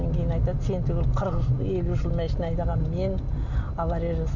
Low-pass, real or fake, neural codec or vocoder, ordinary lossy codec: 7.2 kHz; real; none; none